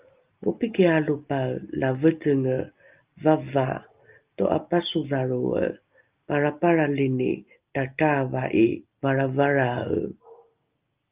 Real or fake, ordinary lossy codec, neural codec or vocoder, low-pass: real; Opus, 32 kbps; none; 3.6 kHz